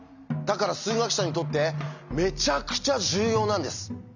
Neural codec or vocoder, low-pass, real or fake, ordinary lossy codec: none; 7.2 kHz; real; none